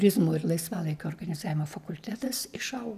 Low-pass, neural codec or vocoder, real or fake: 14.4 kHz; none; real